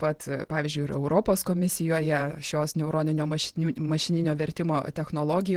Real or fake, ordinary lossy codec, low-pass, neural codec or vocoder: fake; Opus, 16 kbps; 19.8 kHz; vocoder, 48 kHz, 128 mel bands, Vocos